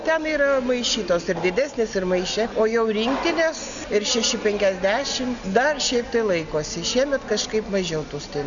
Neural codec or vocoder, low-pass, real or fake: none; 7.2 kHz; real